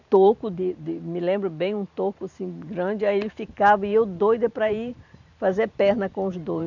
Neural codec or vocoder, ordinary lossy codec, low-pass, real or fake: vocoder, 44.1 kHz, 128 mel bands every 512 samples, BigVGAN v2; none; 7.2 kHz; fake